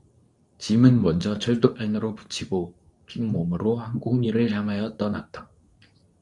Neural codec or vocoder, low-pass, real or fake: codec, 24 kHz, 0.9 kbps, WavTokenizer, medium speech release version 2; 10.8 kHz; fake